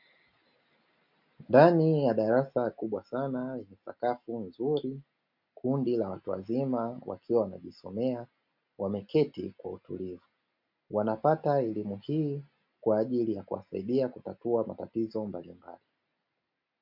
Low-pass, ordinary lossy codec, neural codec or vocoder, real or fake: 5.4 kHz; MP3, 48 kbps; none; real